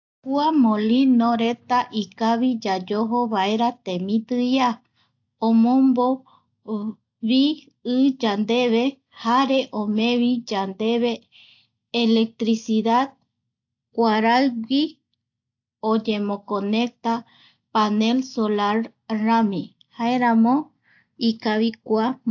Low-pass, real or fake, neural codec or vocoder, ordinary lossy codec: 7.2 kHz; real; none; AAC, 48 kbps